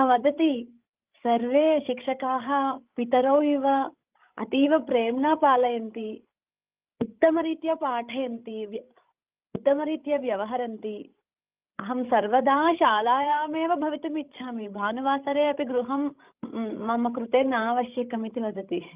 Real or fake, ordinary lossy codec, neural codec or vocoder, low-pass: fake; Opus, 24 kbps; codec, 16 kHz, 16 kbps, FreqCodec, larger model; 3.6 kHz